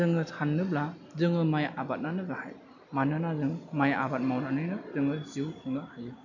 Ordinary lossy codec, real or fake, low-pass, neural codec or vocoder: none; real; 7.2 kHz; none